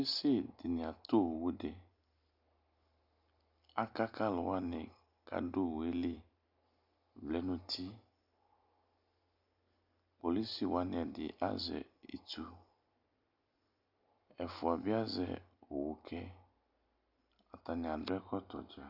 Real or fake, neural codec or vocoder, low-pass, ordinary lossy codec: real; none; 5.4 kHz; Opus, 64 kbps